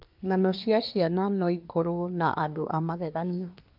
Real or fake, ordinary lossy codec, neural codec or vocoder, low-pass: fake; AAC, 48 kbps; codec, 24 kHz, 1 kbps, SNAC; 5.4 kHz